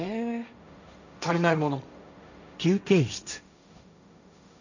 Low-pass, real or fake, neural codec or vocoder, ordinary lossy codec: 7.2 kHz; fake; codec, 16 kHz, 1.1 kbps, Voila-Tokenizer; none